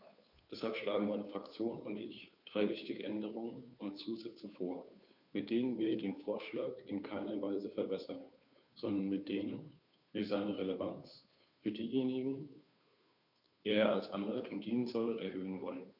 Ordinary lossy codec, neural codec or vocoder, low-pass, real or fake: none; codec, 16 kHz, 2 kbps, FunCodec, trained on Chinese and English, 25 frames a second; 5.4 kHz; fake